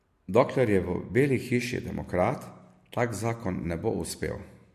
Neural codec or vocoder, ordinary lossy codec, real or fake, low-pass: none; MP3, 64 kbps; real; 14.4 kHz